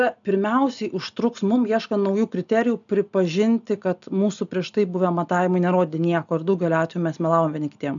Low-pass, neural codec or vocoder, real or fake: 7.2 kHz; none; real